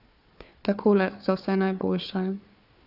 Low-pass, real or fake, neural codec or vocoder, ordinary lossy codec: 5.4 kHz; fake; codec, 16 kHz, 4 kbps, FunCodec, trained on Chinese and English, 50 frames a second; none